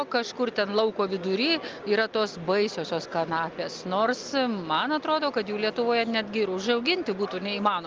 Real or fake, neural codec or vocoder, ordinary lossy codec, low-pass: real; none; Opus, 24 kbps; 7.2 kHz